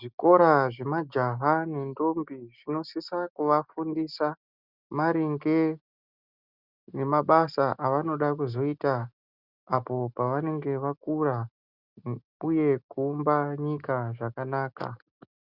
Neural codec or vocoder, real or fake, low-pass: none; real; 5.4 kHz